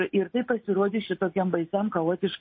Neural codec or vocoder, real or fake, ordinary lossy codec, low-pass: none; real; MP3, 24 kbps; 7.2 kHz